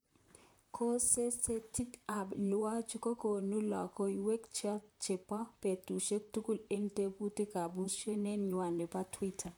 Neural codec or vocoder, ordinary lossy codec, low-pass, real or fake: vocoder, 44.1 kHz, 128 mel bands, Pupu-Vocoder; none; none; fake